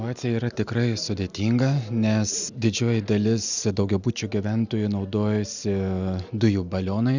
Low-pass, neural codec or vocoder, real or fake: 7.2 kHz; none; real